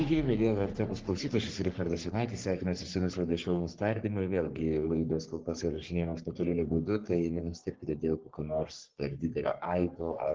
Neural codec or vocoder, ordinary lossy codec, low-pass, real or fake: codec, 44.1 kHz, 3.4 kbps, Pupu-Codec; Opus, 16 kbps; 7.2 kHz; fake